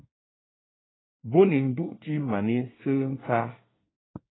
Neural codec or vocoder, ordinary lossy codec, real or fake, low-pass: codec, 24 kHz, 1 kbps, SNAC; AAC, 16 kbps; fake; 7.2 kHz